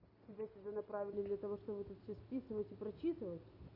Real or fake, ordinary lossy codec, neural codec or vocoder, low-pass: real; none; none; 5.4 kHz